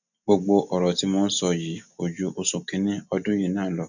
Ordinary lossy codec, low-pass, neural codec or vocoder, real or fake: none; 7.2 kHz; none; real